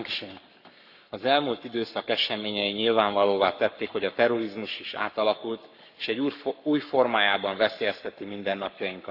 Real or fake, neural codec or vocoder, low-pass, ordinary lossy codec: fake; codec, 44.1 kHz, 7.8 kbps, Pupu-Codec; 5.4 kHz; none